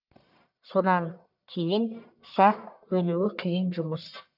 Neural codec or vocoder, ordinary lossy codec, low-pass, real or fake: codec, 44.1 kHz, 1.7 kbps, Pupu-Codec; none; 5.4 kHz; fake